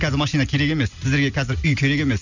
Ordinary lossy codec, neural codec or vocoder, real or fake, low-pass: none; none; real; 7.2 kHz